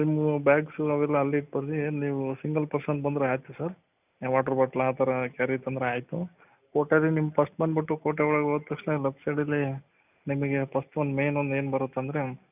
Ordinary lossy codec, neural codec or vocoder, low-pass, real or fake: none; none; 3.6 kHz; real